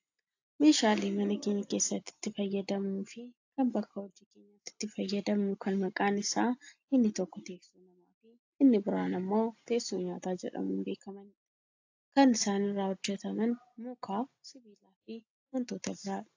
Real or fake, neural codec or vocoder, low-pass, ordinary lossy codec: real; none; 7.2 kHz; AAC, 48 kbps